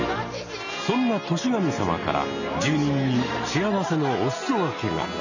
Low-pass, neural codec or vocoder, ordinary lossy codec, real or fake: 7.2 kHz; none; none; real